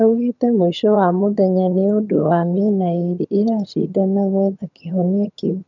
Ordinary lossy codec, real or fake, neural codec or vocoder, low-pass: none; fake; vocoder, 22.05 kHz, 80 mel bands, HiFi-GAN; 7.2 kHz